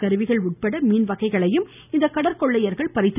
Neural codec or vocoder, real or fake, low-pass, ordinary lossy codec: none; real; 3.6 kHz; none